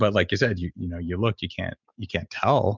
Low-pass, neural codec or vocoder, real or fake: 7.2 kHz; none; real